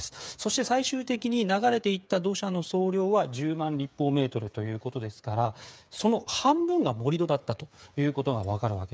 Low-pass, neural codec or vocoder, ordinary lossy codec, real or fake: none; codec, 16 kHz, 8 kbps, FreqCodec, smaller model; none; fake